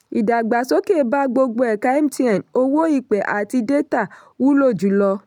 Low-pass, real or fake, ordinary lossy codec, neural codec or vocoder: 19.8 kHz; real; none; none